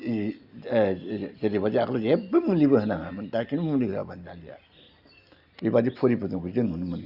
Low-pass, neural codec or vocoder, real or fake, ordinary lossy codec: 5.4 kHz; none; real; Opus, 64 kbps